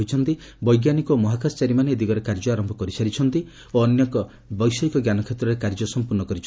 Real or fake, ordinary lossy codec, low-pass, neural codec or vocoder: real; none; none; none